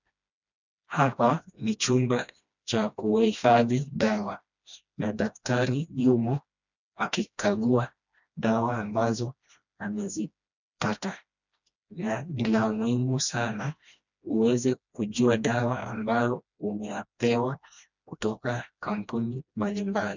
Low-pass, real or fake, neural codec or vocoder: 7.2 kHz; fake; codec, 16 kHz, 1 kbps, FreqCodec, smaller model